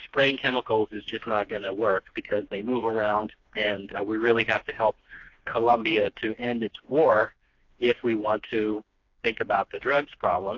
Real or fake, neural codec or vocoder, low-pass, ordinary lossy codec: fake; codec, 16 kHz, 2 kbps, FreqCodec, smaller model; 7.2 kHz; AAC, 48 kbps